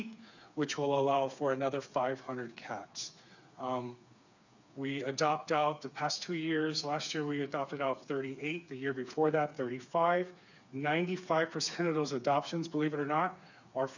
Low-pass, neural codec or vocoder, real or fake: 7.2 kHz; codec, 16 kHz, 4 kbps, FreqCodec, smaller model; fake